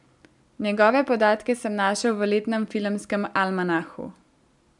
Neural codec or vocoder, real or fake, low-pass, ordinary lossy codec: none; real; 10.8 kHz; none